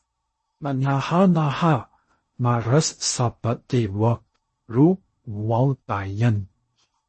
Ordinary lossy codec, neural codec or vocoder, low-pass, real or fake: MP3, 32 kbps; codec, 16 kHz in and 24 kHz out, 0.6 kbps, FocalCodec, streaming, 2048 codes; 10.8 kHz; fake